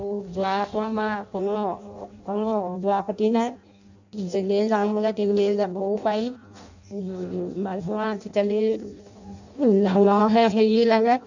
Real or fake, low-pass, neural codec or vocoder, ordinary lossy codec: fake; 7.2 kHz; codec, 16 kHz in and 24 kHz out, 0.6 kbps, FireRedTTS-2 codec; none